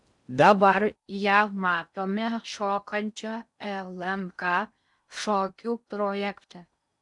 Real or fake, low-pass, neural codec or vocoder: fake; 10.8 kHz; codec, 16 kHz in and 24 kHz out, 0.6 kbps, FocalCodec, streaming, 4096 codes